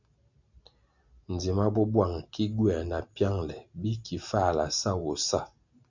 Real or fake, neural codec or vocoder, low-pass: real; none; 7.2 kHz